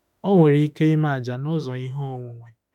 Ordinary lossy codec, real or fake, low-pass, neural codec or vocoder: none; fake; 19.8 kHz; autoencoder, 48 kHz, 32 numbers a frame, DAC-VAE, trained on Japanese speech